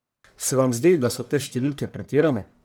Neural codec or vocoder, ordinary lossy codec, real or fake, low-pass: codec, 44.1 kHz, 1.7 kbps, Pupu-Codec; none; fake; none